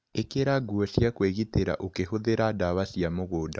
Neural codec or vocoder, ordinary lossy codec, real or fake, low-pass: none; none; real; none